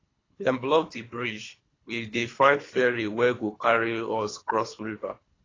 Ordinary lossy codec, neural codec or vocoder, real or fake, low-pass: AAC, 32 kbps; codec, 24 kHz, 3 kbps, HILCodec; fake; 7.2 kHz